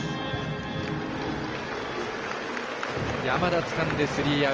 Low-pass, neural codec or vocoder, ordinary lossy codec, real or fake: 7.2 kHz; none; Opus, 24 kbps; real